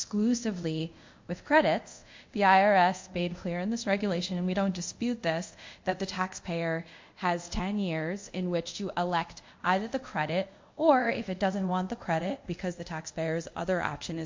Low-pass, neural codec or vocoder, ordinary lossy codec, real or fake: 7.2 kHz; codec, 24 kHz, 0.5 kbps, DualCodec; MP3, 48 kbps; fake